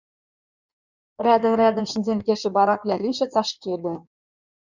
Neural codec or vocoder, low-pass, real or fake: codec, 16 kHz in and 24 kHz out, 1.1 kbps, FireRedTTS-2 codec; 7.2 kHz; fake